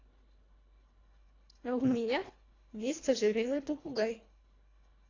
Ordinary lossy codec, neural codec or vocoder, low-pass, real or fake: AAC, 32 kbps; codec, 24 kHz, 1.5 kbps, HILCodec; 7.2 kHz; fake